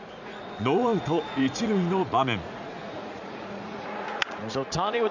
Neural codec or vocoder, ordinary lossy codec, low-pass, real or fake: vocoder, 44.1 kHz, 80 mel bands, Vocos; none; 7.2 kHz; fake